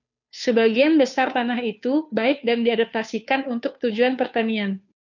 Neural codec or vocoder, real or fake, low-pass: codec, 16 kHz, 2 kbps, FunCodec, trained on Chinese and English, 25 frames a second; fake; 7.2 kHz